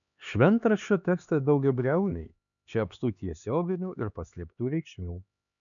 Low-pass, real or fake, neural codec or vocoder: 7.2 kHz; fake; codec, 16 kHz, 2 kbps, X-Codec, HuBERT features, trained on LibriSpeech